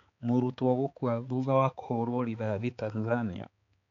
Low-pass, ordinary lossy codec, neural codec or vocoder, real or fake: 7.2 kHz; none; codec, 16 kHz, 4 kbps, X-Codec, HuBERT features, trained on balanced general audio; fake